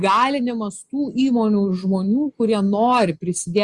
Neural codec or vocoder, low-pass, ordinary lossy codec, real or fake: none; 10.8 kHz; Opus, 64 kbps; real